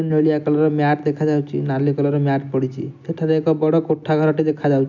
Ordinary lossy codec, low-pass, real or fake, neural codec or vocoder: none; 7.2 kHz; real; none